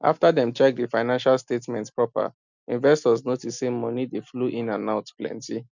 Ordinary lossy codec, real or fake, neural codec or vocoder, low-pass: none; real; none; 7.2 kHz